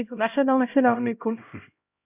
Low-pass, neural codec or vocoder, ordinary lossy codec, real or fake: 3.6 kHz; codec, 16 kHz, 0.5 kbps, X-Codec, HuBERT features, trained on LibriSpeech; none; fake